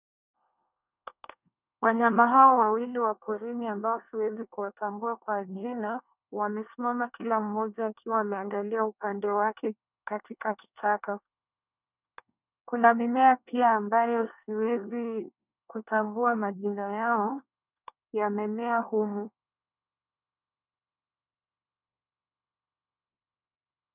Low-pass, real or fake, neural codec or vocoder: 3.6 kHz; fake; codec, 24 kHz, 1 kbps, SNAC